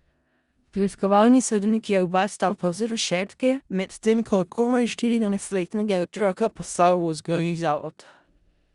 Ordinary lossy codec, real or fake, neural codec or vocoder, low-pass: Opus, 64 kbps; fake; codec, 16 kHz in and 24 kHz out, 0.4 kbps, LongCat-Audio-Codec, four codebook decoder; 10.8 kHz